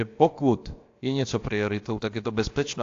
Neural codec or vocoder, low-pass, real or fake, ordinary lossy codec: codec, 16 kHz, about 1 kbps, DyCAST, with the encoder's durations; 7.2 kHz; fake; AAC, 48 kbps